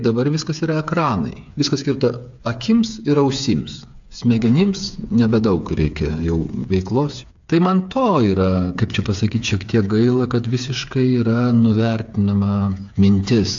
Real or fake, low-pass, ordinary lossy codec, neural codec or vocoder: fake; 7.2 kHz; AAC, 64 kbps; codec, 16 kHz, 8 kbps, FreqCodec, smaller model